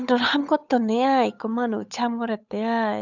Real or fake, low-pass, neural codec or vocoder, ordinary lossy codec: fake; 7.2 kHz; codec, 16 kHz, 8 kbps, FunCodec, trained on Chinese and English, 25 frames a second; none